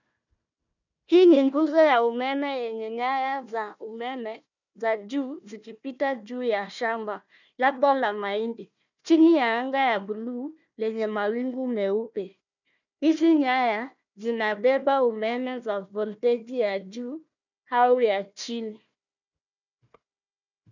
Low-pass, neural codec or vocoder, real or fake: 7.2 kHz; codec, 16 kHz, 1 kbps, FunCodec, trained on Chinese and English, 50 frames a second; fake